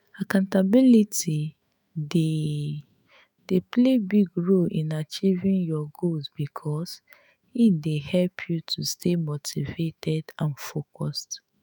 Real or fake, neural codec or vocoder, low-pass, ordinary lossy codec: fake; autoencoder, 48 kHz, 128 numbers a frame, DAC-VAE, trained on Japanese speech; none; none